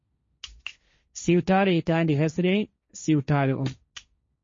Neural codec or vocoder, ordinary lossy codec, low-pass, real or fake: codec, 16 kHz, 1.1 kbps, Voila-Tokenizer; MP3, 32 kbps; 7.2 kHz; fake